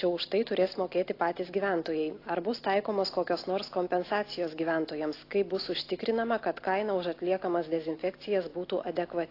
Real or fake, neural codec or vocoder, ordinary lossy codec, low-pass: real; none; AAC, 32 kbps; 5.4 kHz